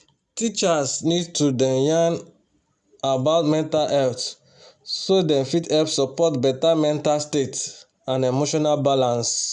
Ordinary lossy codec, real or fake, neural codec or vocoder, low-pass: none; real; none; 10.8 kHz